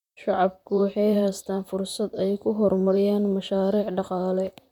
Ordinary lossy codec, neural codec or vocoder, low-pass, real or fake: none; vocoder, 44.1 kHz, 128 mel bands, Pupu-Vocoder; 19.8 kHz; fake